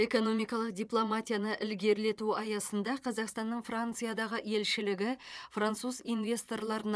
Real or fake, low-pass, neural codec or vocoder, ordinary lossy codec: fake; none; vocoder, 22.05 kHz, 80 mel bands, Vocos; none